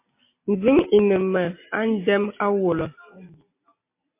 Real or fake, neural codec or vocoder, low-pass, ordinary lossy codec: real; none; 3.6 kHz; MP3, 24 kbps